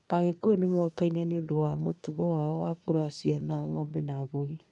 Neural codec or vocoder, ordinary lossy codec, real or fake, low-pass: codec, 24 kHz, 1 kbps, SNAC; none; fake; 10.8 kHz